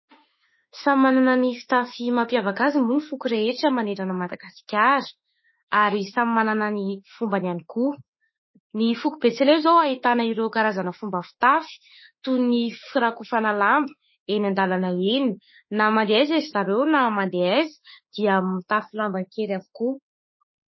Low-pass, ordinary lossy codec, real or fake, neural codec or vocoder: 7.2 kHz; MP3, 24 kbps; fake; autoencoder, 48 kHz, 32 numbers a frame, DAC-VAE, trained on Japanese speech